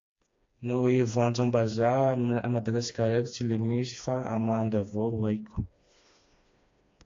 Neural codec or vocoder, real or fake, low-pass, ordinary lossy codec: codec, 16 kHz, 2 kbps, FreqCodec, smaller model; fake; 7.2 kHz; AAC, 48 kbps